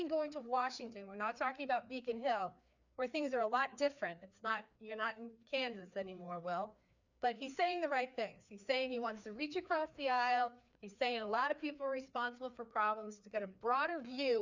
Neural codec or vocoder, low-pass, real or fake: codec, 16 kHz, 2 kbps, FreqCodec, larger model; 7.2 kHz; fake